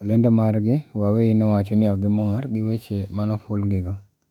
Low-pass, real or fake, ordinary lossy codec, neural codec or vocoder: 19.8 kHz; fake; none; autoencoder, 48 kHz, 32 numbers a frame, DAC-VAE, trained on Japanese speech